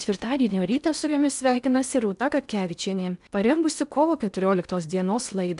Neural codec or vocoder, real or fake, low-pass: codec, 16 kHz in and 24 kHz out, 0.6 kbps, FocalCodec, streaming, 4096 codes; fake; 10.8 kHz